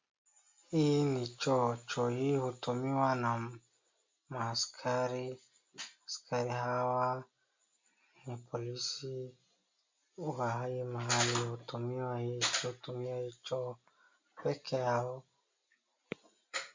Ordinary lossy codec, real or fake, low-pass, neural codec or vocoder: MP3, 64 kbps; real; 7.2 kHz; none